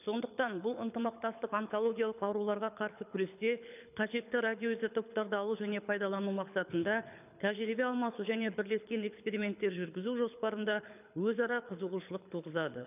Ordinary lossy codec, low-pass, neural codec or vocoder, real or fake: none; 3.6 kHz; codec, 24 kHz, 6 kbps, HILCodec; fake